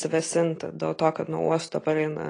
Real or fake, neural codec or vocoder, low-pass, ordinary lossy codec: real; none; 9.9 kHz; AAC, 32 kbps